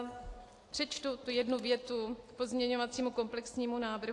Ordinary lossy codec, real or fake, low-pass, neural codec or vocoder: AAC, 48 kbps; real; 10.8 kHz; none